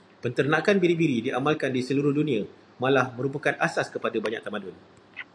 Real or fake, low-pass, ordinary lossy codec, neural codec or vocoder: real; 9.9 kHz; AAC, 64 kbps; none